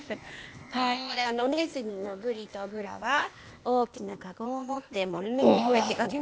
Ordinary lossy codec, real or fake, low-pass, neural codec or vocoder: none; fake; none; codec, 16 kHz, 0.8 kbps, ZipCodec